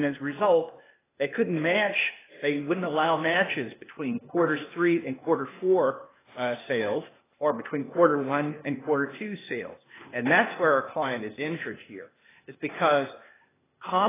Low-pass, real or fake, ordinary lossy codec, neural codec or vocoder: 3.6 kHz; fake; AAC, 16 kbps; codec, 16 kHz, 0.8 kbps, ZipCodec